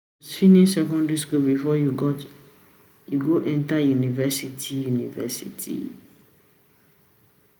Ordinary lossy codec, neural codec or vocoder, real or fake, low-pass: none; none; real; none